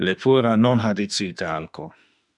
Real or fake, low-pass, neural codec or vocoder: fake; 10.8 kHz; autoencoder, 48 kHz, 32 numbers a frame, DAC-VAE, trained on Japanese speech